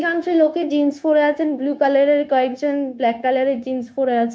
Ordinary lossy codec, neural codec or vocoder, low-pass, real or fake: none; codec, 16 kHz, 0.9 kbps, LongCat-Audio-Codec; none; fake